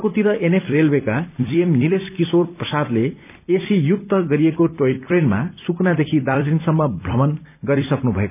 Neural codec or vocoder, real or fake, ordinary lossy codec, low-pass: vocoder, 44.1 kHz, 128 mel bands every 256 samples, BigVGAN v2; fake; none; 3.6 kHz